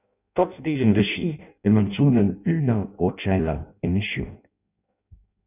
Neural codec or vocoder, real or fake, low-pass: codec, 16 kHz in and 24 kHz out, 0.6 kbps, FireRedTTS-2 codec; fake; 3.6 kHz